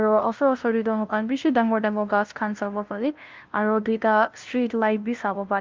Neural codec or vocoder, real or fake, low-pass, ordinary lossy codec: codec, 16 kHz, 0.5 kbps, FunCodec, trained on LibriTTS, 25 frames a second; fake; 7.2 kHz; Opus, 32 kbps